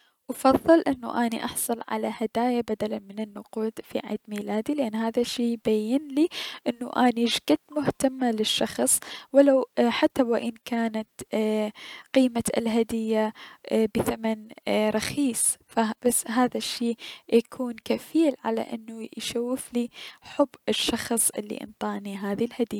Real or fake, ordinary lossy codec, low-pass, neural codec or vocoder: real; none; 19.8 kHz; none